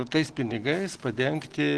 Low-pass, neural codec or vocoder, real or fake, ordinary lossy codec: 10.8 kHz; none; real; Opus, 16 kbps